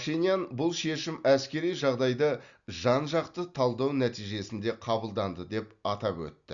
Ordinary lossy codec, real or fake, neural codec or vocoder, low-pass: Opus, 64 kbps; real; none; 7.2 kHz